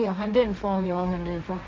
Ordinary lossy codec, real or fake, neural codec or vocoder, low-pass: none; fake; codec, 16 kHz, 1.1 kbps, Voila-Tokenizer; none